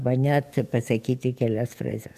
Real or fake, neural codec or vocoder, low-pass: fake; codec, 44.1 kHz, 7.8 kbps, DAC; 14.4 kHz